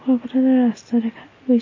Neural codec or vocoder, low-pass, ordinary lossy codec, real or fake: none; 7.2 kHz; MP3, 32 kbps; real